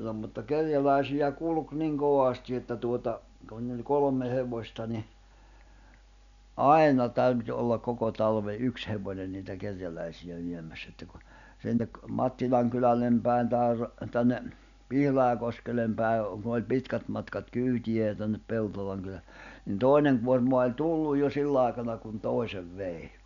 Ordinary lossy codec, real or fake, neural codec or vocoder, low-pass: none; real; none; 7.2 kHz